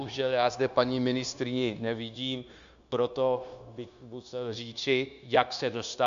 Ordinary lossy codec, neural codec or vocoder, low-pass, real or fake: AAC, 96 kbps; codec, 16 kHz, 0.9 kbps, LongCat-Audio-Codec; 7.2 kHz; fake